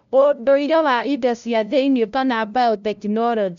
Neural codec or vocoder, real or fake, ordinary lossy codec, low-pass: codec, 16 kHz, 0.5 kbps, FunCodec, trained on LibriTTS, 25 frames a second; fake; none; 7.2 kHz